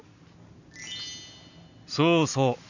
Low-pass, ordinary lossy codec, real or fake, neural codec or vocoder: 7.2 kHz; none; real; none